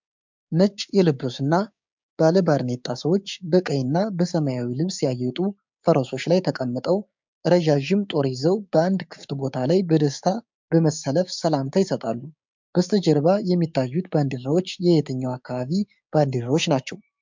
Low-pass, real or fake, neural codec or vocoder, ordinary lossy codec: 7.2 kHz; fake; codec, 16 kHz, 6 kbps, DAC; MP3, 64 kbps